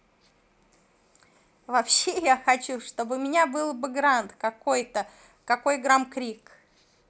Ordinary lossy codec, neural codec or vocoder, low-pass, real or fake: none; none; none; real